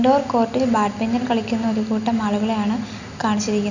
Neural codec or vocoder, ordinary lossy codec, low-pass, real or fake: none; none; 7.2 kHz; real